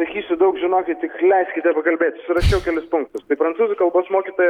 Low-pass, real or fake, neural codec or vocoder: 19.8 kHz; real; none